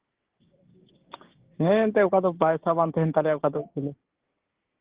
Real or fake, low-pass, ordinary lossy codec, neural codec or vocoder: fake; 3.6 kHz; Opus, 16 kbps; codec, 16 kHz, 6 kbps, DAC